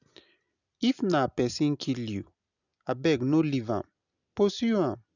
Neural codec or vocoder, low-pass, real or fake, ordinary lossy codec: none; 7.2 kHz; real; none